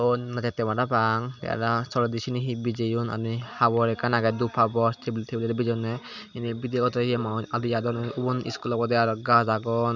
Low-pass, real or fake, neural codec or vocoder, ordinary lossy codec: 7.2 kHz; real; none; none